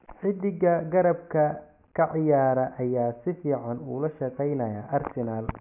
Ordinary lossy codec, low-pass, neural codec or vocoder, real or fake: none; 3.6 kHz; none; real